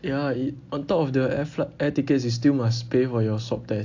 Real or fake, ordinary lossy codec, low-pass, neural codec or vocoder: real; AAC, 48 kbps; 7.2 kHz; none